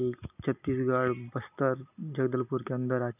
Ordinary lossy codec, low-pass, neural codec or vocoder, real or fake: none; 3.6 kHz; none; real